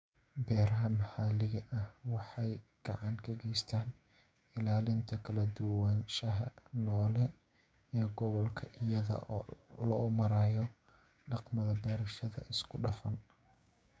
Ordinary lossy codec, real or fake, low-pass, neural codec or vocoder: none; fake; none; codec, 16 kHz, 6 kbps, DAC